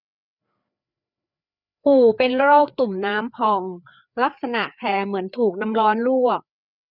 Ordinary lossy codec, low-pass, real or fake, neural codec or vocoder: none; 5.4 kHz; fake; codec, 16 kHz, 4 kbps, FreqCodec, larger model